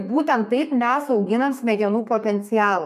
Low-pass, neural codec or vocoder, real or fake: 14.4 kHz; codec, 32 kHz, 1.9 kbps, SNAC; fake